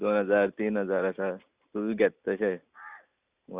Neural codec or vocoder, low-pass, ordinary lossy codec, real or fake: none; 3.6 kHz; Opus, 64 kbps; real